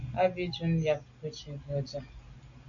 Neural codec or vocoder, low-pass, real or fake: none; 7.2 kHz; real